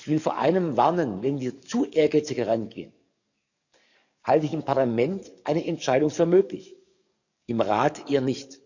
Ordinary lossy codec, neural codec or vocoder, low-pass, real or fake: none; codec, 44.1 kHz, 7.8 kbps, DAC; 7.2 kHz; fake